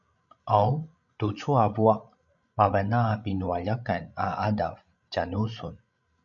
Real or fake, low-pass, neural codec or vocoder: fake; 7.2 kHz; codec, 16 kHz, 16 kbps, FreqCodec, larger model